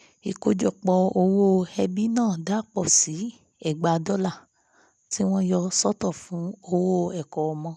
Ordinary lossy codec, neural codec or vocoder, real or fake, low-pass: none; none; real; none